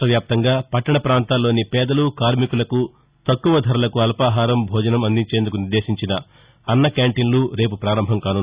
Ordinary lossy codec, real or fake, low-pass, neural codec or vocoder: Opus, 64 kbps; real; 3.6 kHz; none